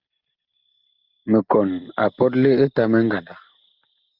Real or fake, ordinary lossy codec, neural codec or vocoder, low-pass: real; Opus, 32 kbps; none; 5.4 kHz